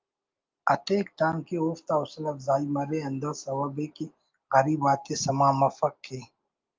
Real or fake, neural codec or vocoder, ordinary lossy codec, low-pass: real; none; Opus, 24 kbps; 7.2 kHz